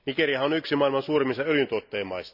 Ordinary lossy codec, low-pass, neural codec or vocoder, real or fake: none; 5.4 kHz; none; real